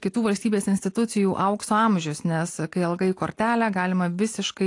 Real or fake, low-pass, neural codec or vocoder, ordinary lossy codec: real; 10.8 kHz; none; AAC, 48 kbps